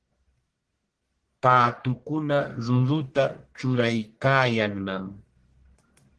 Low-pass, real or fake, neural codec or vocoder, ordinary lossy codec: 10.8 kHz; fake; codec, 44.1 kHz, 1.7 kbps, Pupu-Codec; Opus, 16 kbps